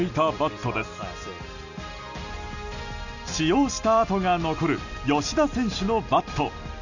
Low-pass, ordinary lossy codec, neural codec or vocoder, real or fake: 7.2 kHz; none; none; real